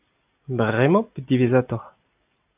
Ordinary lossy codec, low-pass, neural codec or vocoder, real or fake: AAC, 32 kbps; 3.6 kHz; none; real